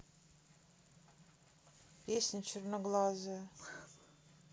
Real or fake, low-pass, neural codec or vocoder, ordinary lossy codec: real; none; none; none